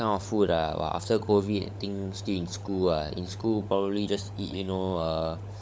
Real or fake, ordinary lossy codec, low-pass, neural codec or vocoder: fake; none; none; codec, 16 kHz, 16 kbps, FunCodec, trained on LibriTTS, 50 frames a second